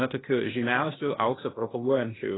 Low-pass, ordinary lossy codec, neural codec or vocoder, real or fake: 7.2 kHz; AAC, 16 kbps; codec, 16 kHz, 0.8 kbps, ZipCodec; fake